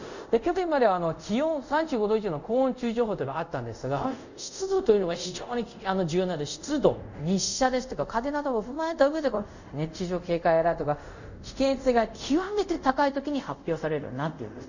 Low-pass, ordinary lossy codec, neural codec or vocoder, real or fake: 7.2 kHz; none; codec, 24 kHz, 0.5 kbps, DualCodec; fake